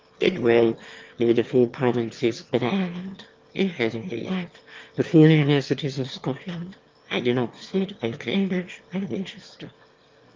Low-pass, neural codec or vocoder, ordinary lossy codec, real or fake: 7.2 kHz; autoencoder, 22.05 kHz, a latent of 192 numbers a frame, VITS, trained on one speaker; Opus, 24 kbps; fake